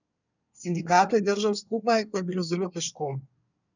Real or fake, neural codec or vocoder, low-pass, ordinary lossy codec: fake; codec, 24 kHz, 1 kbps, SNAC; 7.2 kHz; none